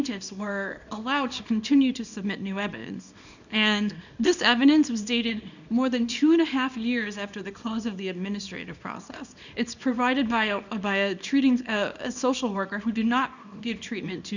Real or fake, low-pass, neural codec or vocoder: fake; 7.2 kHz; codec, 24 kHz, 0.9 kbps, WavTokenizer, small release